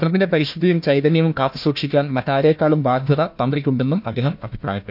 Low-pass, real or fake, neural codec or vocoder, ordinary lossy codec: 5.4 kHz; fake; codec, 16 kHz, 1 kbps, FunCodec, trained on Chinese and English, 50 frames a second; none